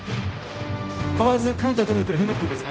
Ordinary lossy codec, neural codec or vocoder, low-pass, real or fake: none; codec, 16 kHz, 0.5 kbps, X-Codec, HuBERT features, trained on general audio; none; fake